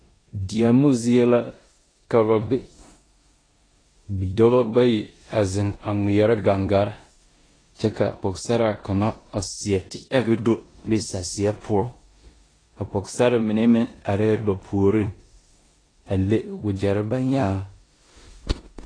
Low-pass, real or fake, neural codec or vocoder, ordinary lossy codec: 9.9 kHz; fake; codec, 16 kHz in and 24 kHz out, 0.9 kbps, LongCat-Audio-Codec, four codebook decoder; AAC, 32 kbps